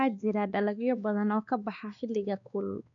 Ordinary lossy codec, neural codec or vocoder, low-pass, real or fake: none; codec, 16 kHz, 2 kbps, X-Codec, WavLM features, trained on Multilingual LibriSpeech; 7.2 kHz; fake